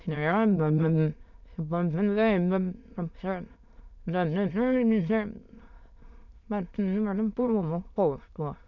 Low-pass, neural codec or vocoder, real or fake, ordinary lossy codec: 7.2 kHz; autoencoder, 22.05 kHz, a latent of 192 numbers a frame, VITS, trained on many speakers; fake; none